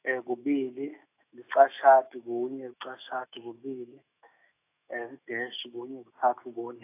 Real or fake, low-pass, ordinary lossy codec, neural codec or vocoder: fake; 3.6 kHz; AAC, 24 kbps; autoencoder, 48 kHz, 128 numbers a frame, DAC-VAE, trained on Japanese speech